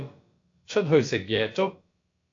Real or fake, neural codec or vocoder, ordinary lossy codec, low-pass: fake; codec, 16 kHz, about 1 kbps, DyCAST, with the encoder's durations; AAC, 48 kbps; 7.2 kHz